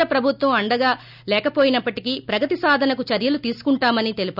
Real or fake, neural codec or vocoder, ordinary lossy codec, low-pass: real; none; none; 5.4 kHz